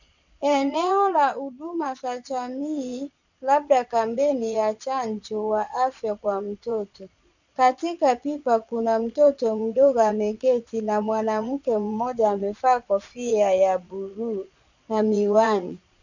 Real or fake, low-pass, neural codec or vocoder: fake; 7.2 kHz; vocoder, 22.05 kHz, 80 mel bands, Vocos